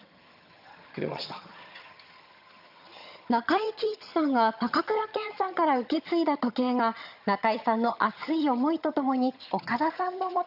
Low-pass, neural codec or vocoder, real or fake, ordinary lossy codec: 5.4 kHz; vocoder, 22.05 kHz, 80 mel bands, HiFi-GAN; fake; none